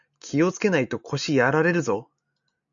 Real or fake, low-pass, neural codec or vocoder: real; 7.2 kHz; none